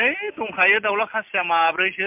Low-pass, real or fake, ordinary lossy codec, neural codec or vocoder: 3.6 kHz; real; MP3, 32 kbps; none